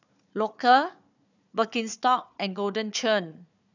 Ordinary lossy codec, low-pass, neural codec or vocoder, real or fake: none; 7.2 kHz; codec, 16 kHz, 4 kbps, FunCodec, trained on Chinese and English, 50 frames a second; fake